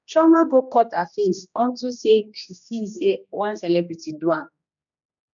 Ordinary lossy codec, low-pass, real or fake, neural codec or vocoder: none; 7.2 kHz; fake; codec, 16 kHz, 1 kbps, X-Codec, HuBERT features, trained on general audio